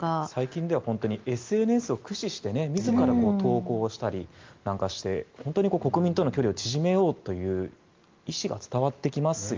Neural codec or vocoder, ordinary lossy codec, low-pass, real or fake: none; Opus, 24 kbps; 7.2 kHz; real